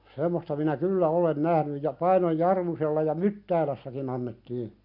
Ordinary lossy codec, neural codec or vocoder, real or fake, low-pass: none; none; real; 5.4 kHz